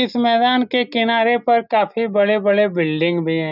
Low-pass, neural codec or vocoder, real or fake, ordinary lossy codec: 5.4 kHz; none; real; none